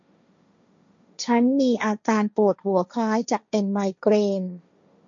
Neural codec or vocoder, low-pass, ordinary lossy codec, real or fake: codec, 16 kHz, 1.1 kbps, Voila-Tokenizer; 7.2 kHz; MP3, 48 kbps; fake